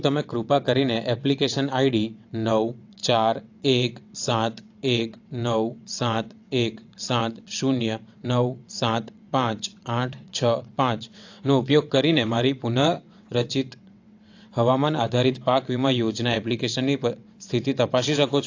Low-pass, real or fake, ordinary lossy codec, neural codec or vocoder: 7.2 kHz; fake; AAC, 48 kbps; vocoder, 22.05 kHz, 80 mel bands, WaveNeXt